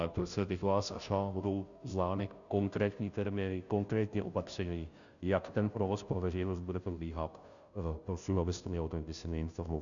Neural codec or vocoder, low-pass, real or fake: codec, 16 kHz, 0.5 kbps, FunCodec, trained on Chinese and English, 25 frames a second; 7.2 kHz; fake